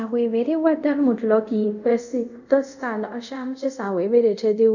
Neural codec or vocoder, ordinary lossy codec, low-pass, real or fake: codec, 24 kHz, 0.5 kbps, DualCodec; none; 7.2 kHz; fake